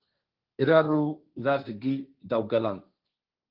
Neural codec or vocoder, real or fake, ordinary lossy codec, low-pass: codec, 16 kHz, 1.1 kbps, Voila-Tokenizer; fake; Opus, 32 kbps; 5.4 kHz